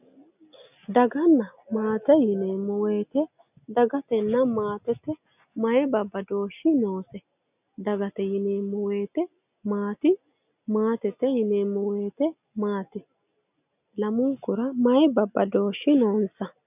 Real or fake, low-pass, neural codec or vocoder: real; 3.6 kHz; none